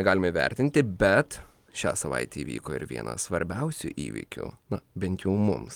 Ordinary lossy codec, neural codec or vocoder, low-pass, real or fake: Opus, 24 kbps; none; 19.8 kHz; real